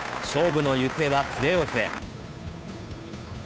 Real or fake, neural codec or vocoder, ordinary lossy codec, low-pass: fake; codec, 16 kHz, 2 kbps, FunCodec, trained on Chinese and English, 25 frames a second; none; none